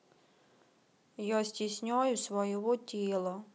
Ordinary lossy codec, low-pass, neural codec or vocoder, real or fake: none; none; none; real